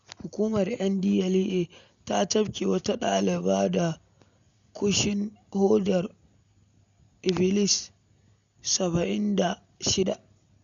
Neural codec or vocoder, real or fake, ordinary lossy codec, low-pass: none; real; none; 7.2 kHz